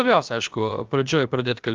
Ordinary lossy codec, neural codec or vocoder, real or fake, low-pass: Opus, 32 kbps; codec, 16 kHz, about 1 kbps, DyCAST, with the encoder's durations; fake; 7.2 kHz